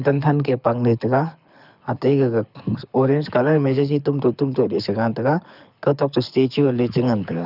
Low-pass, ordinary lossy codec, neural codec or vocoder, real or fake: 5.4 kHz; none; codec, 24 kHz, 6 kbps, HILCodec; fake